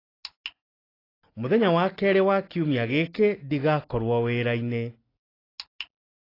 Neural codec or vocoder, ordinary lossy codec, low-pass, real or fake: none; AAC, 24 kbps; 5.4 kHz; real